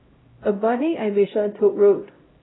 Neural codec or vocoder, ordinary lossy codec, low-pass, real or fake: codec, 16 kHz, 0.5 kbps, X-Codec, HuBERT features, trained on LibriSpeech; AAC, 16 kbps; 7.2 kHz; fake